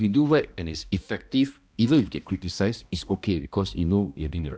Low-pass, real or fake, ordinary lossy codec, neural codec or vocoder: none; fake; none; codec, 16 kHz, 1 kbps, X-Codec, HuBERT features, trained on balanced general audio